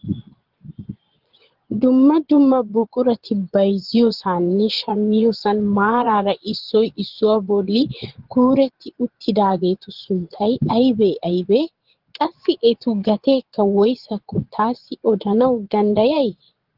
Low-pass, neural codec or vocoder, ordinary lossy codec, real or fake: 5.4 kHz; vocoder, 22.05 kHz, 80 mel bands, Vocos; Opus, 16 kbps; fake